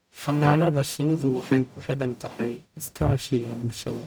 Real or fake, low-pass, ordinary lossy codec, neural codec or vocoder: fake; none; none; codec, 44.1 kHz, 0.9 kbps, DAC